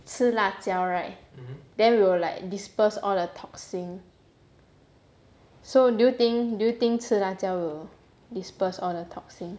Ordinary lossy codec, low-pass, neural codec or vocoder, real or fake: none; none; none; real